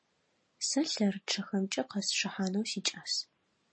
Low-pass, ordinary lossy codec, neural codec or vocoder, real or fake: 9.9 kHz; MP3, 32 kbps; none; real